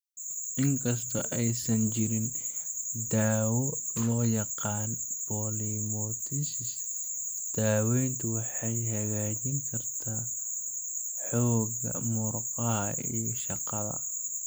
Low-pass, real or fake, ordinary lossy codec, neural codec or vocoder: none; real; none; none